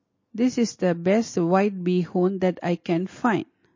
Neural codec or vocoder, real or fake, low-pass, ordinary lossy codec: none; real; 7.2 kHz; MP3, 32 kbps